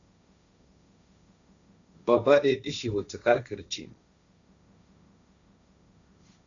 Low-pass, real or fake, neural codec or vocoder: 7.2 kHz; fake; codec, 16 kHz, 1.1 kbps, Voila-Tokenizer